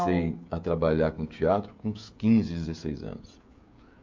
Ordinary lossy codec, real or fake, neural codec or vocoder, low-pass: MP3, 48 kbps; fake; codec, 16 kHz, 16 kbps, FreqCodec, smaller model; 7.2 kHz